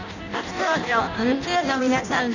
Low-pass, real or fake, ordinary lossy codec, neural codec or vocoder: 7.2 kHz; fake; none; codec, 16 kHz in and 24 kHz out, 0.6 kbps, FireRedTTS-2 codec